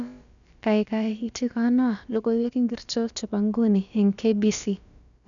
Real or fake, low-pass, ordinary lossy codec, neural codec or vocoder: fake; 7.2 kHz; none; codec, 16 kHz, about 1 kbps, DyCAST, with the encoder's durations